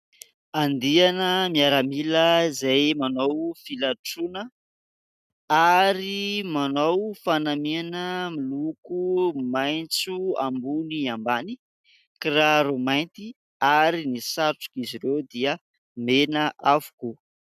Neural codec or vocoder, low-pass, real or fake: none; 14.4 kHz; real